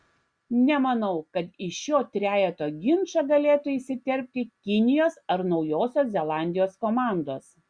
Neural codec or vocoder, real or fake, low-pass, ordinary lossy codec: none; real; 9.9 kHz; Opus, 64 kbps